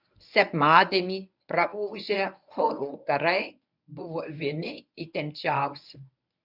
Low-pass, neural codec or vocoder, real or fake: 5.4 kHz; codec, 24 kHz, 0.9 kbps, WavTokenizer, medium speech release version 1; fake